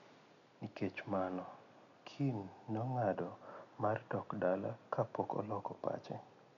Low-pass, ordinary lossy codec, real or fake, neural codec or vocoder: 7.2 kHz; none; real; none